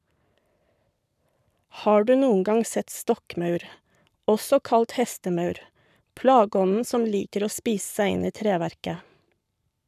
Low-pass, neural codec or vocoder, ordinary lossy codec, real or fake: 14.4 kHz; codec, 44.1 kHz, 7.8 kbps, Pupu-Codec; none; fake